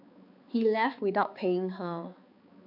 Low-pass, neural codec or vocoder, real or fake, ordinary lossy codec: 5.4 kHz; codec, 16 kHz, 4 kbps, X-Codec, HuBERT features, trained on balanced general audio; fake; AAC, 48 kbps